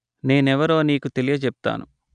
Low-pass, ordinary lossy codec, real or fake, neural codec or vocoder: 9.9 kHz; none; real; none